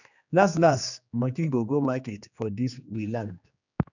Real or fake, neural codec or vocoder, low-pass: fake; codec, 16 kHz, 2 kbps, X-Codec, HuBERT features, trained on general audio; 7.2 kHz